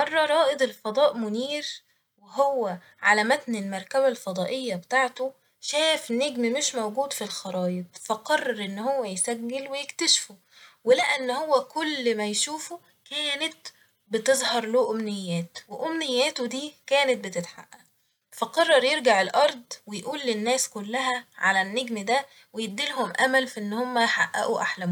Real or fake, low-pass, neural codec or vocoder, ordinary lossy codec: real; 19.8 kHz; none; none